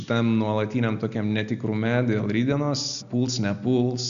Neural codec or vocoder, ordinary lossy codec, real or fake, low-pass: none; AAC, 96 kbps; real; 7.2 kHz